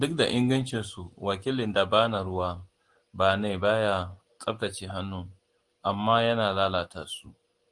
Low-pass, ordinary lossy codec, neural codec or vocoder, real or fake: 10.8 kHz; Opus, 24 kbps; none; real